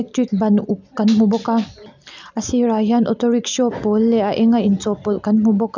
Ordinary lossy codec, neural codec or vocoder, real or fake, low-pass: none; none; real; 7.2 kHz